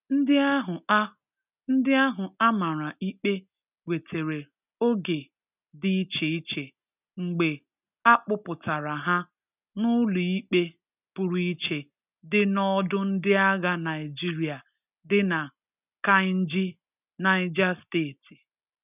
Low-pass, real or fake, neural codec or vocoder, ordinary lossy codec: 3.6 kHz; real; none; none